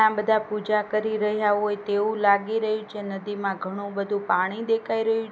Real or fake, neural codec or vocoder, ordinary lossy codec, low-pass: real; none; none; none